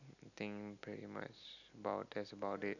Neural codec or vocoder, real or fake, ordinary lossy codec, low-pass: none; real; none; 7.2 kHz